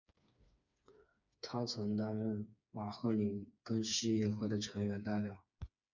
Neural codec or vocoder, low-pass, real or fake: codec, 16 kHz, 4 kbps, FreqCodec, smaller model; 7.2 kHz; fake